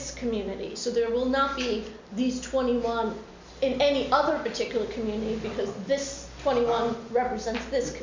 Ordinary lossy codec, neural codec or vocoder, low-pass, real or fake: MP3, 64 kbps; none; 7.2 kHz; real